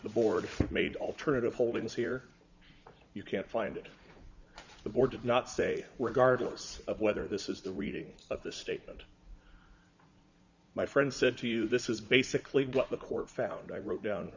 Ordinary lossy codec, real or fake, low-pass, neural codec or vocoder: Opus, 64 kbps; fake; 7.2 kHz; vocoder, 44.1 kHz, 80 mel bands, Vocos